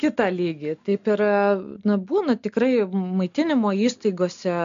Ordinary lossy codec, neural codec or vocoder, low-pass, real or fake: AAC, 64 kbps; none; 7.2 kHz; real